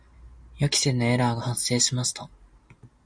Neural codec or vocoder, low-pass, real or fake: none; 9.9 kHz; real